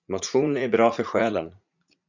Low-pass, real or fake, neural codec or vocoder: 7.2 kHz; fake; vocoder, 44.1 kHz, 80 mel bands, Vocos